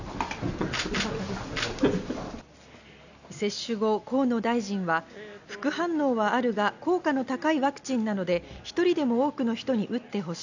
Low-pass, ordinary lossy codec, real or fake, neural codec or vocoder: 7.2 kHz; none; real; none